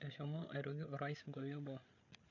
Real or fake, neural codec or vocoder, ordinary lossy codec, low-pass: fake; codec, 16 kHz, 8 kbps, FreqCodec, larger model; none; 7.2 kHz